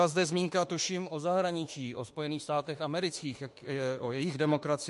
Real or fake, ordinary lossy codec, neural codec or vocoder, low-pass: fake; MP3, 48 kbps; autoencoder, 48 kHz, 32 numbers a frame, DAC-VAE, trained on Japanese speech; 14.4 kHz